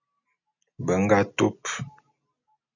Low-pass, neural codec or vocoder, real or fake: 7.2 kHz; none; real